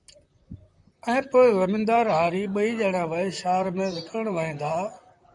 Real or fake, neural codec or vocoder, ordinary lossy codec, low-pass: fake; vocoder, 44.1 kHz, 128 mel bands, Pupu-Vocoder; MP3, 96 kbps; 10.8 kHz